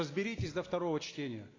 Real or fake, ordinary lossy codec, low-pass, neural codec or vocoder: real; AAC, 32 kbps; 7.2 kHz; none